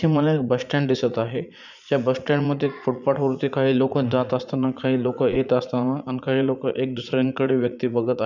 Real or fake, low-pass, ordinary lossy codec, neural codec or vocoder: fake; 7.2 kHz; none; vocoder, 44.1 kHz, 80 mel bands, Vocos